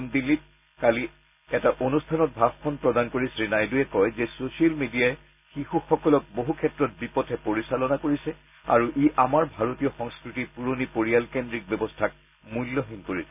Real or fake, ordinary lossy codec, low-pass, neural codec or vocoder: real; none; 3.6 kHz; none